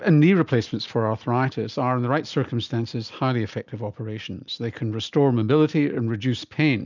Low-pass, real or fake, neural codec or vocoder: 7.2 kHz; real; none